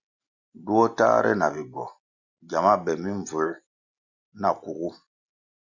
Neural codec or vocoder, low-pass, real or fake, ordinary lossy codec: none; 7.2 kHz; real; Opus, 64 kbps